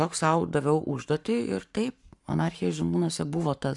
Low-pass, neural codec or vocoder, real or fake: 10.8 kHz; codec, 44.1 kHz, 7.8 kbps, Pupu-Codec; fake